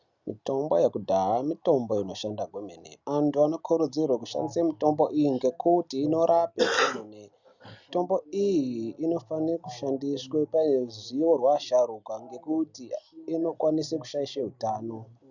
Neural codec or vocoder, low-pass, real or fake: none; 7.2 kHz; real